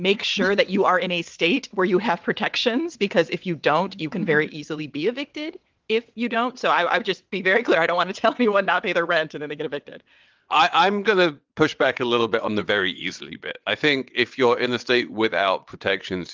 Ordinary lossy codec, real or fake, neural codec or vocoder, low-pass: Opus, 32 kbps; fake; vocoder, 22.05 kHz, 80 mel bands, WaveNeXt; 7.2 kHz